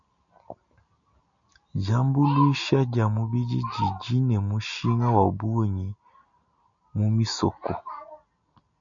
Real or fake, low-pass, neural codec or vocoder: real; 7.2 kHz; none